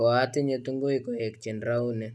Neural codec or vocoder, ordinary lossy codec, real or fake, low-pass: none; none; real; none